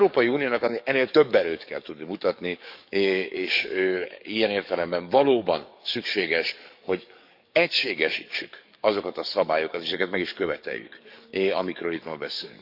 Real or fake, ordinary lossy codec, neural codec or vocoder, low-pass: fake; none; codec, 44.1 kHz, 7.8 kbps, DAC; 5.4 kHz